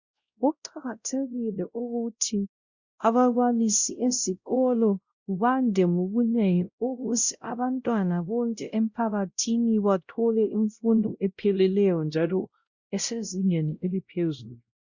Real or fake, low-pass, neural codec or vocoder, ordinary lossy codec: fake; 7.2 kHz; codec, 16 kHz, 0.5 kbps, X-Codec, WavLM features, trained on Multilingual LibriSpeech; Opus, 64 kbps